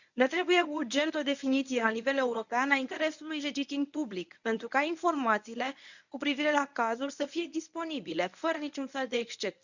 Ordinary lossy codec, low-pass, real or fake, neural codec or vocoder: none; 7.2 kHz; fake; codec, 24 kHz, 0.9 kbps, WavTokenizer, medium speech release version 1